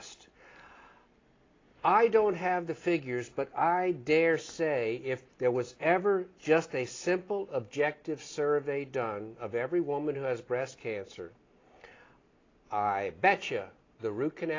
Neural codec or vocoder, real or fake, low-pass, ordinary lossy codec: none; real; 7.2 kHz; AAC, 32 kbps